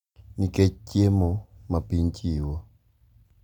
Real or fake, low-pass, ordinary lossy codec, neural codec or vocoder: real; 19.8 kHz; none; none